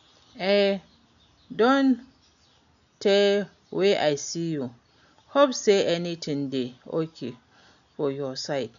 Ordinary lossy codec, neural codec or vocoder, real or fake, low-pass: none; none; real; 7.2 kHz